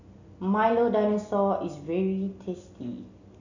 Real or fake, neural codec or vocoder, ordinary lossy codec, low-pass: real; none; none; 7.2 kHz